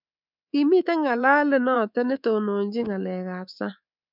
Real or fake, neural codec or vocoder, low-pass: fake; codec, 24 kHz, 3.1 kbps, DualCodec; 5.4 kHz